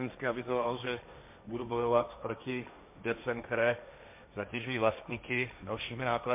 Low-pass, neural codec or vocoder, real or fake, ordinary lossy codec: 3.6 kHz; codec, 16 kHz, 1.1 kbps, Voila-Tokenizer; fake; MP3, 32 kbps